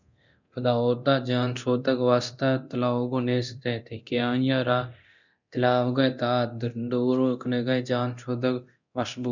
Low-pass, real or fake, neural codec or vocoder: 7.2 kHz; fake; codec, 24 kHz, 0.9 kbps, DualCodec